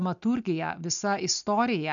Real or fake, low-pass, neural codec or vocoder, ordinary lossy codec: real; 7.2 kHz; none; MP3, 96 kbps